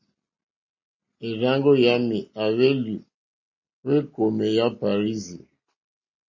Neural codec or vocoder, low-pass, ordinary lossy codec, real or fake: none; 7.2 kHz; MP3, 32 kbps; real